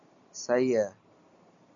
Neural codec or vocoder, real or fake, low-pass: none; real; 7.2 kHz